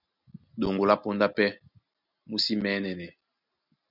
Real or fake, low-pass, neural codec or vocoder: real; 5.4 kHz; none